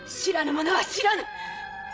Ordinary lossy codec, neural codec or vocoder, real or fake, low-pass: none; codec, 16 kHz, 16 kbps, FreqCodec, larger model; fake; none